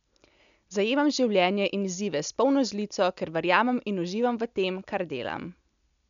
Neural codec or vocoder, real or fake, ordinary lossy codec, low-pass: none; real; none; 7.2 kHz